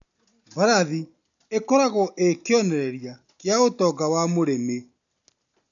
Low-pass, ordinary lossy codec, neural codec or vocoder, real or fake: 7.2 kHz; none; none; real